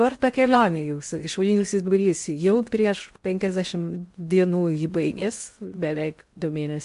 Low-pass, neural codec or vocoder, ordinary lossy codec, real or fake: 10.8 kHz; codec, 16 kHz in and 24 kHz out, 0.6 kbps, FocalCodec, streaming, 2048 codes; MP3, 64 kbps; fake